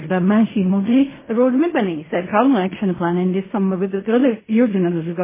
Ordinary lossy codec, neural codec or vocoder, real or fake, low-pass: MP3, 16 kbps; codec, 16 kHz in and 24 kHz out, 0.4 kbps, LongCat-Audio-Codec, fine tuned four codebook decoder; fake; 3.6 kHz